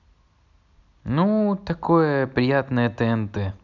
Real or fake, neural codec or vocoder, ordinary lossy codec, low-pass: real; none; none; 7.2 kHz